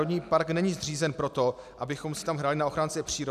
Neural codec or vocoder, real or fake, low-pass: none; real; 14.4 kHz